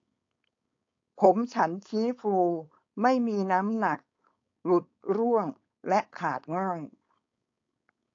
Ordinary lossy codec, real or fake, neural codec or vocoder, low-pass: AAC, 48 kbps; fake; codec, 16 kHz, 4.8 kbps, FACodec; 7.2 kHz